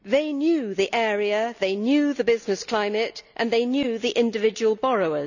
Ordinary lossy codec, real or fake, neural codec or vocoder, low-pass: none; real; none; 7.2 kHz